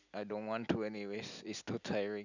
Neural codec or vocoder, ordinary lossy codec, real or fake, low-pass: none; none; real; 7.2 kHz